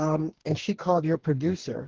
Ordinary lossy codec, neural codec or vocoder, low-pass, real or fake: Opus, 16 kbps; codec, 44.1 kHz, 2.6 kbps, DAC; 7.2 kHz; fake